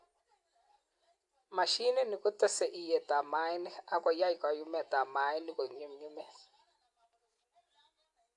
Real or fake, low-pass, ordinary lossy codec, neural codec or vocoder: real; 10.8 kHz; AAC, 64 kbps; none